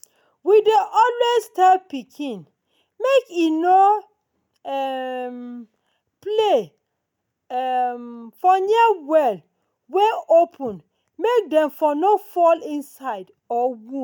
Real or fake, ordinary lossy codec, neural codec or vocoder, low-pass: real; none; none; 19.8 kHz